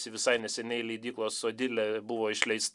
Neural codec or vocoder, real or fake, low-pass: none; real; 10.8 kHz